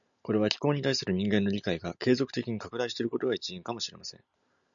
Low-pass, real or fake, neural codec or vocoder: 7.2 kHz; real; none